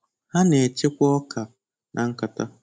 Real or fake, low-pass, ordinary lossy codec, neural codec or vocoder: real; none; none; none